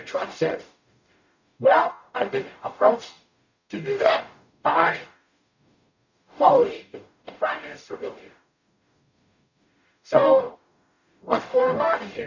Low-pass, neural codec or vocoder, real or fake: 7.2 kHz; codec, 44.1 kHz, 0.9 kbps, DAC; fake